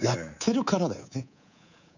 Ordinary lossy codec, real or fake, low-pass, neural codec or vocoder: none; fake; 7.2 kHz; codec, 24 kHz, 3.1 kbps, DualCodec